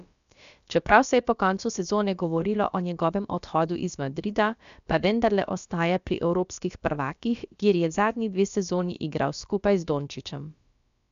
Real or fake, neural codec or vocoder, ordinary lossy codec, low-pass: fake; codec, 16 kHz, about 1 kbps, DyCAST, with the encoder's durations; none; 7.2 kHz